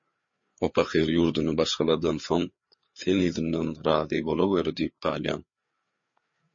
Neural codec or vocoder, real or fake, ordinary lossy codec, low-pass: codec, 16 kHz, 4 kbps, FreqCodec, larger model; fake; MP3, 32 kbps; 7.2 kHz